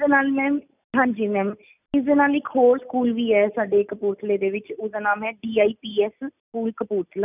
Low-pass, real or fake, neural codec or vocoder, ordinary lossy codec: 3.6 kHz; real; none; none